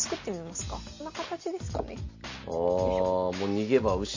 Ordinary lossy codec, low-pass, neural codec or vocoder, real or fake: MP3, 32 kbps; 7.2 kHz; none; real